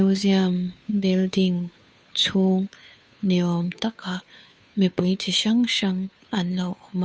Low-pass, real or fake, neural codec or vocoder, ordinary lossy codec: none; fake; codec, 16 kHz, 2 kbps, FunCodec, trained on Chinese and English, 25 frames a second; none